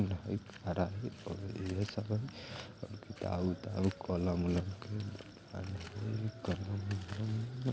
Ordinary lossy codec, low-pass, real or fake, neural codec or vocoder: none; none; real; none